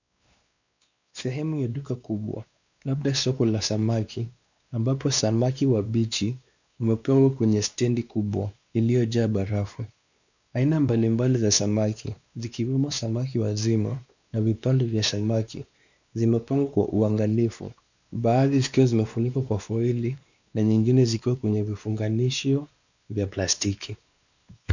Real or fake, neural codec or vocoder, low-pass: fake; codec, 16 kHz, 2 kbps, X-Codec, WavLM features, trained on Multilingual LibriSpeech; 7.2 kHz